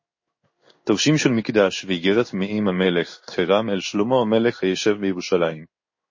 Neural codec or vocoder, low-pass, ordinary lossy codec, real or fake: codec, 16 kHz in and 24 kHz out, 1 kbps, XY-Tokenizer; 7.2 kHz; MP3, 32 kbps; fake